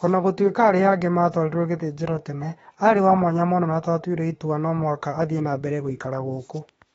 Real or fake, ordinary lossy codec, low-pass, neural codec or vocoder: fake; AAC, 24 kbps; 19.8 kHz; autoencoder, 48 kHz, 32 numbers a frame, DAC-VAE, trained on Japanese speech